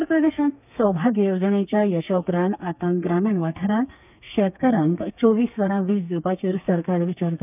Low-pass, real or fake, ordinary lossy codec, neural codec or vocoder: 3.6 kHz; fake; none; codec, 32 kHz, 1.9 kbps, SNAC